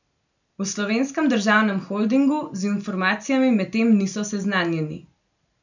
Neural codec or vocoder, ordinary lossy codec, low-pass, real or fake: none; none; 7.2 kHz; real